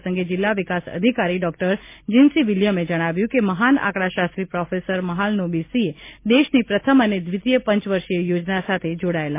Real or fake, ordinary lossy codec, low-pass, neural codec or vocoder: real; MP3, 24 kbps; 3.6 kHz; none